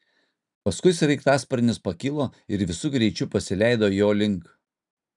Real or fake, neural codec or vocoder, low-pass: real; none; 10.8 kHz